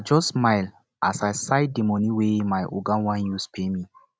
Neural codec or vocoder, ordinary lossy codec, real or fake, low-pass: none; none; real; none